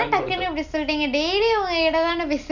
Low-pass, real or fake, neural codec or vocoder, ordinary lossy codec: 7.2 kHz; real; none; none